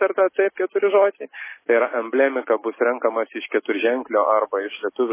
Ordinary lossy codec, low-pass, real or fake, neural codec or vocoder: MP3, 16 kbps; 3.6 kHz; real; none